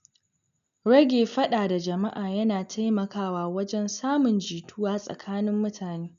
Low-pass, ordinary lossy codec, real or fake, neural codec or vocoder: 7.2 kHz; none; real; none